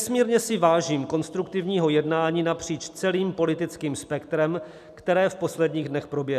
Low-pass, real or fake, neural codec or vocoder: 14.4 kHz; real; none